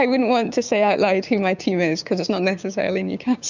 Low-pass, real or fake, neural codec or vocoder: 7.2 kHz; fake; codec, 16 kHz, 6 kbps, DAC